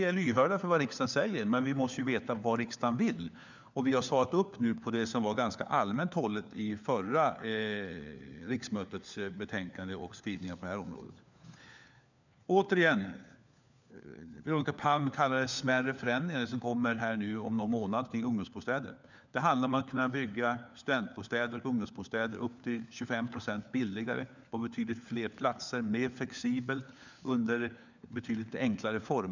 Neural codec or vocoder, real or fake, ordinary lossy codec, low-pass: codec, 16 kHz, 4 kbps, FunCodec, trained on LibriTTS, 50 frames a second; fake; none; 7.2 kHz